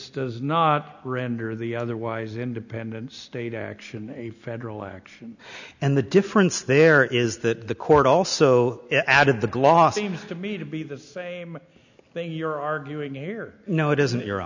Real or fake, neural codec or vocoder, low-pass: real; none; 7.2 kHz